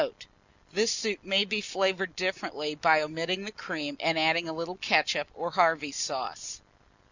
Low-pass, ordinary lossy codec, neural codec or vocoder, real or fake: 7.2 kHz; Opus, 64 kbps; none; real